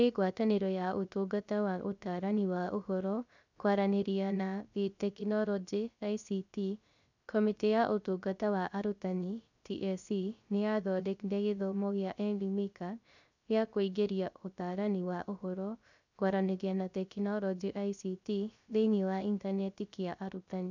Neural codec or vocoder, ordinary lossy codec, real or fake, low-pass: codec, 16 kHz, 0.3 kbps, FocalCodec; none; fake; 7.2 kHz